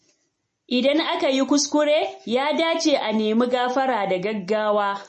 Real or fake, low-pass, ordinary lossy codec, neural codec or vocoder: real; 10.8 kHz; MP3, 32 kbps; none